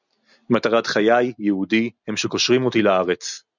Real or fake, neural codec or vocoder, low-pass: real; none; 7.2 kHz